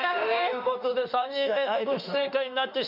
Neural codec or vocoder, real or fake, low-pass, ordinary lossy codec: autoencoder, 48 kHz, 32 numbers a frame, DAC-VAE, trained on Japanese speech; fake; 5.4 kHz; none